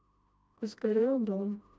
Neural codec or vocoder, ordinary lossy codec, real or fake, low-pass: codec, 16 kHz, 1 kbps, FreqCodec, smaller model; none; fake; none